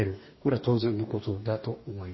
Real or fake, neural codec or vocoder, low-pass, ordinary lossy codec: fake; codec, 44.1 kHz, 2.6 kbps, DAC; 7.2 kHz; MP3, 24 kbps